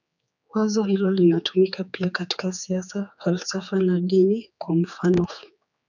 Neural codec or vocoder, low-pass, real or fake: codec, 16 kHz, 4 kbps, X-Codec, HuBERT features, trained on general audio; 7.2 kHz; fake